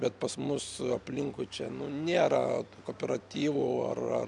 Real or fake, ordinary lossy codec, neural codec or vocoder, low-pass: real; MP3, 96 kbps; none; 10.8 kHz